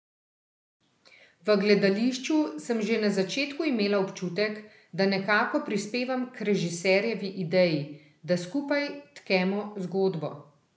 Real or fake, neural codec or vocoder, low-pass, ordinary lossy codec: real; none; none; none